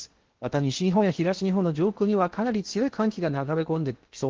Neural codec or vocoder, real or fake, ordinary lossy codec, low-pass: codec, 16 kHz in and 24 kHz out, 0.8 kbps, FocalCodec, streaming, 65536 codes; fake; Opus, 16 kbps; 7.2 kHz